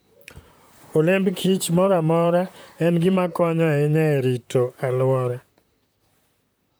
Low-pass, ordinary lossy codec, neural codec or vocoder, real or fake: none; none; vocoder, 44.1 kHz, 128 mel bands, Pupu-Vocoder; fake